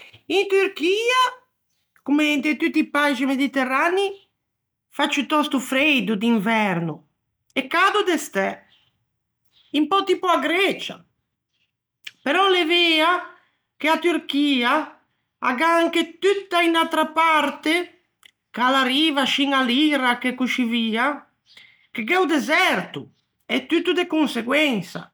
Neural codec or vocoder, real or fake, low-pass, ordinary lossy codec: none; real; none; none